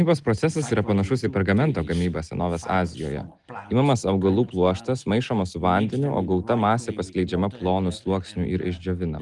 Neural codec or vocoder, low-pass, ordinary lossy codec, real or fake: none; 10.8 kHz; Opus, 24 kbps; real